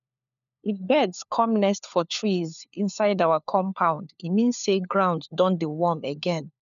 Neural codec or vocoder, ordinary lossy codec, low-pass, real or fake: codec, 16 kHz, 4 kbps, FunCodec, trained on LibriTTS, 50 frames a second; none; 7.2 kHz; fake